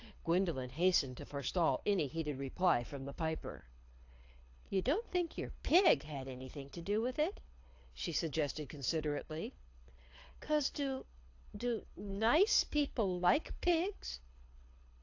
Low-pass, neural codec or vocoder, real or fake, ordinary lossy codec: 7.2 kHz; codec, 24 kHz, 6 kbps, HILCodec; fake; AAC, 48 kbps